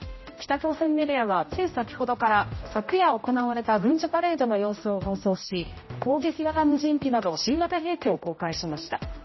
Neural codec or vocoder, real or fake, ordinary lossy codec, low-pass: codec, 16 kHz, 0.5 kbps, X-Codec, HuBERT features, trained on general audio; fake; MP3, 24 kbps; 7.2 kHz